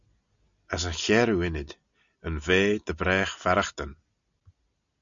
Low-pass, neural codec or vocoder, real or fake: 7.2 kHz; none; real